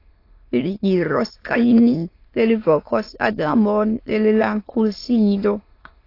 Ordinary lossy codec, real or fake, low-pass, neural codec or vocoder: AAC, 32 kbps; fake; 5.4 kHz; autoencoder, 22.05 kHz, a latent of 192 numbers a frame, VITS, trained on many speakers